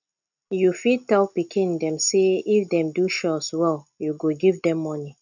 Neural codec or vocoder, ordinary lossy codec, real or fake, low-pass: none; none; real; 7.2 kHz